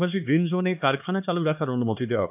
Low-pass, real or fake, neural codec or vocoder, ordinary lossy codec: 3.6 kHz; fake; codec, 16 kHz, 2 kbps, X-Codec, HuBERT features, trained on LibriSpeech; AAC, 32 kbps